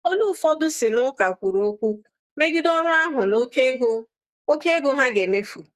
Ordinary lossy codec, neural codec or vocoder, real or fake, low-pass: Opus, 64 kbps; codec, 44.1 kHz, 2.6 kbps, SNAC; fake; 14.4 kHz